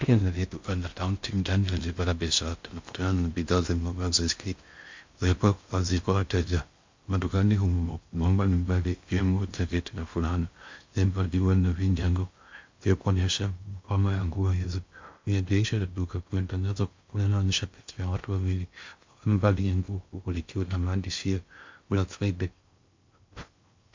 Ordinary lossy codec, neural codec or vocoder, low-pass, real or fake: MP3, 48 kbps; codec, 16 kHz in and 24 kHz out, 0.6 kbps, FocalCodec, streaming, 4096 codes; 7.2 kHz; fake